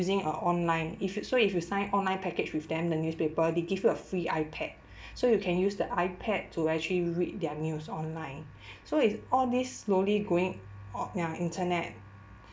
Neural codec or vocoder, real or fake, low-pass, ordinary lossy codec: none; real; none; none